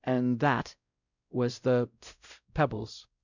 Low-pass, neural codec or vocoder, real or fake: 7.2 kHz; codec, 16 kHz, 0.5 kbps, X-Codec, WavLM features, trained on Multilingual LibriSpeech; fake